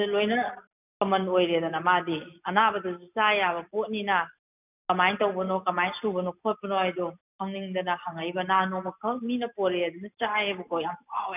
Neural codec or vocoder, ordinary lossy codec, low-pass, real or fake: none; none; 3.6 kHz; real